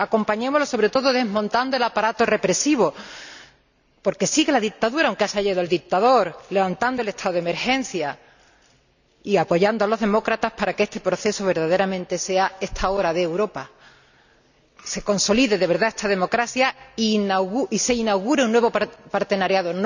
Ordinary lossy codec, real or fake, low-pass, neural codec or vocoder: none; real; 7.2 kHz; none